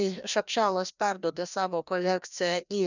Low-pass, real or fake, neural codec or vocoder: 7.2 kHz; fake; codec, 16 kHz, 1 kbps, FreqCodec, larger model